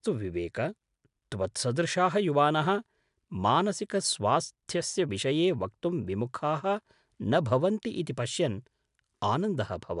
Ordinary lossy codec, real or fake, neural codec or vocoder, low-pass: MP3, 96 kbps; real; none; 10.8 kHz